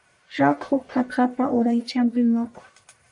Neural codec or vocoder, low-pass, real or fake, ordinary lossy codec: codec, 44.1 kHz, 1.7 kbps, Pupu-Codec; 10.8 kHz; fake; AAC, 64 kbps